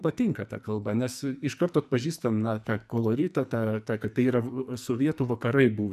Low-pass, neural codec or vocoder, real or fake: 14.4 kHz; codec, 44.1 kHz, 2.6 kbps, SNAC; fake